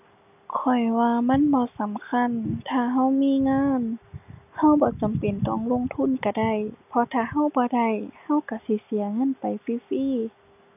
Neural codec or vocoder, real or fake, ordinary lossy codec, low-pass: none; real; none; 3.6 kHz